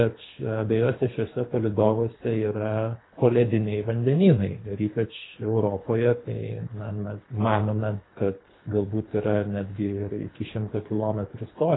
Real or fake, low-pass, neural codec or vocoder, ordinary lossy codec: fake; 7.2 kHz; codec, 24 kHz, 3 kbps, HILCodec; AAC, 16 kbps